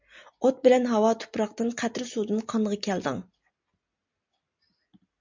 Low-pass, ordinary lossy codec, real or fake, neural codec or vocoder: 7.2 kHz; MP3, 64 kbps; real; none